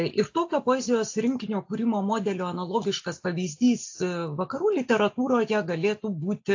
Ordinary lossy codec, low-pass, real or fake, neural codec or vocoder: AAC, 48 kbps; 7.2 kHz; real; none